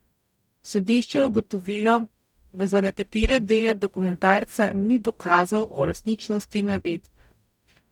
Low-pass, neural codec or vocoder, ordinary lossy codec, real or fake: 19.8 kHz; codec, 44.1 kHz, 0.9 kbps, DAC; none; fake